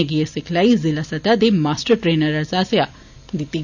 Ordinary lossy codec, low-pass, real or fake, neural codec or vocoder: none; 7.2 kHz; real; none